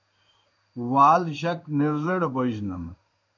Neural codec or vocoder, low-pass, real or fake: codec, 16 kHz in and 24 kHz out, 1 kbps, XY-Tokenizer; 7.2 kHz; fake